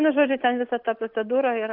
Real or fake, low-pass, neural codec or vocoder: real; 5.4 kHz; none